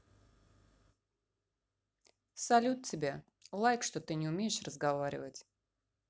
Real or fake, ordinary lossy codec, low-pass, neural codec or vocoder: real; none; none; none